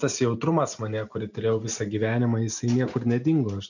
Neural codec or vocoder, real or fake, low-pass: none; real; 7.2 kHz